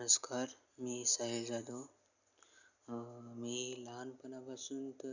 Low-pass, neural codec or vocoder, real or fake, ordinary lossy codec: 7.2 kHz; none; real; none